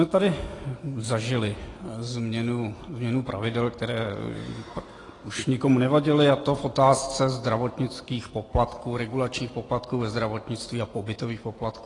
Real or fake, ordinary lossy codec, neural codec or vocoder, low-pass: real; AAC, 32 kbps; none; 10.8 kHz